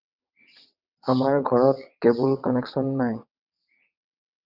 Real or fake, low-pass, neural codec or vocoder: fake; 5.4 kHz; vocoder, 22.05 kHz, 80 mel bands, WaveNeXt